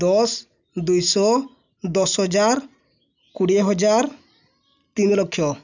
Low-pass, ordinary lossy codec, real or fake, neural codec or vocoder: 7.2 kHz; none; real; none